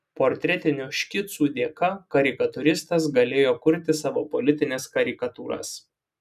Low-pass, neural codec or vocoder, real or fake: 14.4 kHz; none; real